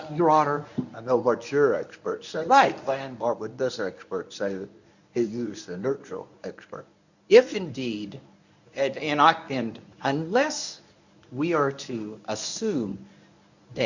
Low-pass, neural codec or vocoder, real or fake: 7.2 kHz; codec, 24 kHz, 0.9 kbps, WavTokenizer, medium speech release version 1; fake